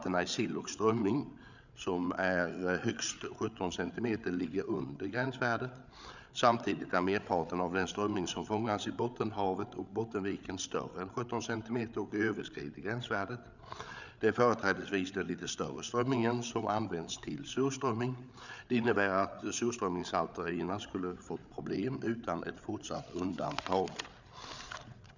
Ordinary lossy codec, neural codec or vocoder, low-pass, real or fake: none; codec, 16 kHz, 8 kbps, FreqCodec, larger model; 7.2 kHz; fake